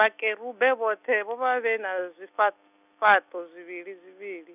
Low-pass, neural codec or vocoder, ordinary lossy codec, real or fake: 3.6 kHz; none; none; real